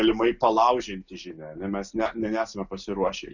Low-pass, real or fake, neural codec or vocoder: 7.2 kHz; real; none